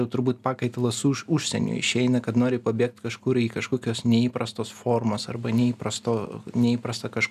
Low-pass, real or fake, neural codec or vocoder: 14.4 kHz; real; none